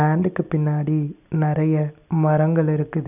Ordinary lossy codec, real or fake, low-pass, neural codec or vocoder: none; real; 3.6 kHz; none